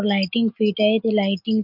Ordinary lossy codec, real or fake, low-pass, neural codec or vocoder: MP3, 48 kbps; real; 5.4 kHz; none